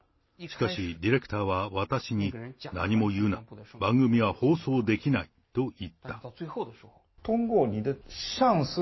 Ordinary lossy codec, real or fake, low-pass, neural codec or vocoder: MP3, 24 kbps; real; 7.2 kHz; none